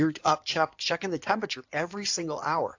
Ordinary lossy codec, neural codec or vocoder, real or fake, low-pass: AAC, 48 kbps; codec, 16 kHz in and 24 kHz out, 2.2 kbps, FireRedTTS-2 codec; fake; 7.2 kHz